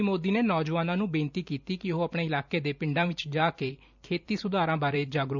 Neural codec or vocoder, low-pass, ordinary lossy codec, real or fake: vocoder, 44.1 kHz, 128 mel bands every 256 samples, BigVGAN v2; 7.2 kHz; none; fake